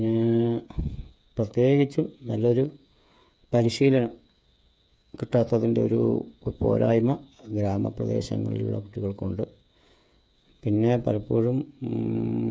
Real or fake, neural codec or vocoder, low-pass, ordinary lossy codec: fake; codec, 16 kHz, 8 kbps, FreqCodec, smaller model; none; none